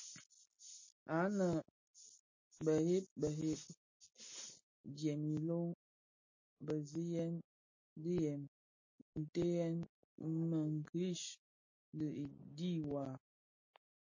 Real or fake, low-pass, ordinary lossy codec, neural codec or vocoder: real; 7.2 kHz; MP3, 32 kbps; none